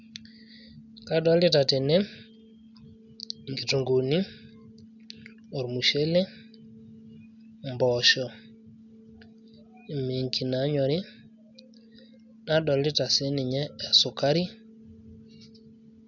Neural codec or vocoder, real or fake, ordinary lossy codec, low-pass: none; real; none; 7.2 kHz